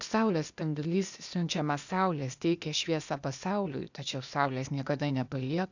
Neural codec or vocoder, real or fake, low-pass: codec, 16 kHz, 0.8 kbps, ZipCodec; fake; 7.2 kHz